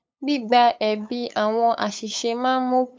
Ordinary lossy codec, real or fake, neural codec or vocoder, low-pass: none; fake; codec, 16 kHz, 8 kbps, FunCodec, trained on LibriTTS, 25 frames a second; none